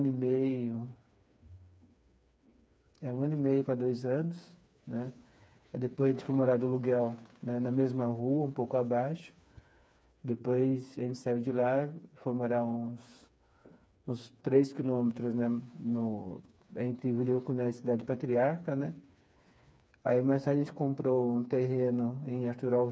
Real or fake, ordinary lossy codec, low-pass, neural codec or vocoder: fake; none; none; codec, 16 kHz, 4 kbps, FreqCodec, smaller model